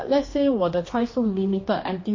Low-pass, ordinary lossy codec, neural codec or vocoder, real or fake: 7.2 kHz; MP3, 32 kbps; codec, 16 kHz, 2 kbps, X-Codec, HuBERT features, trained on general audio; fake